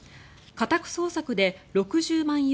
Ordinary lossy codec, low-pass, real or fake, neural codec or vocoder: none; none; real; none